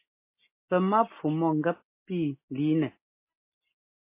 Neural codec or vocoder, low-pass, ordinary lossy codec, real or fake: none; 3.6 kHz; MP3, 24 kbps; real